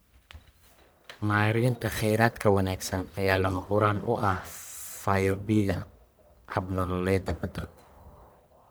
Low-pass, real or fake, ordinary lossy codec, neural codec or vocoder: none; fake; none; codec, 44.1 kHz, 1.7 kbps, Pupu-Codec